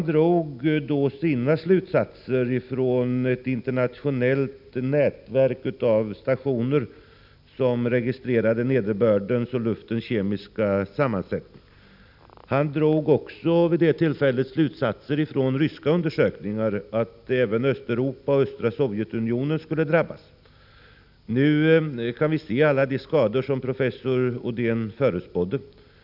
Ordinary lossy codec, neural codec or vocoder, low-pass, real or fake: none; none; 5.4 kHz; real